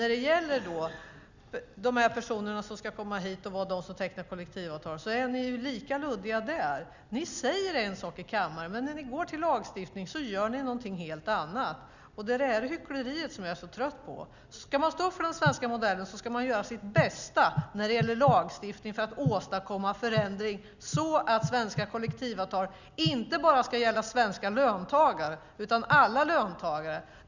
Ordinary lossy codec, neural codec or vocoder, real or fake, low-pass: Opus, 64 kbps; none; real; 7.2 kHz